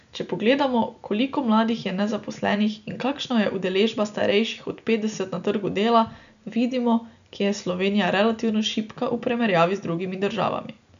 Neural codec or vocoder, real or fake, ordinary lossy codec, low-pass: none; real; none; 7.2 kHz